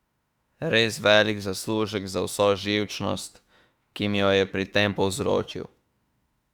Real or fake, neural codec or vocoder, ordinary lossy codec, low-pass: fake; autoencoder, 48 kHz, 32 numbers a frame, DAC-VAE, trained on Japanese speech; Opus, 64 kbps; 19.8 kHz